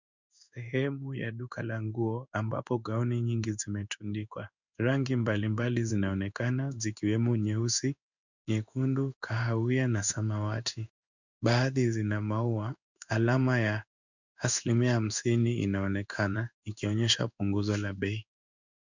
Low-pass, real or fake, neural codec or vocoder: 7.2 kHz; fake; codec, 16 kHz in and 24 kHz out, 1 kbps, XY-Tokenizer